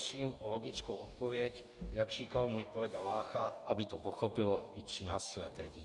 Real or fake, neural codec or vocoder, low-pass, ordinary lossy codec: fake; codec, 44.1 kHz, 2.6 kbps, DAC; 10.8 kHz; Opus, 64 kbps